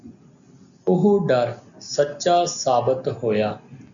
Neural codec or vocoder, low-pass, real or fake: none; 7.2 kHz; real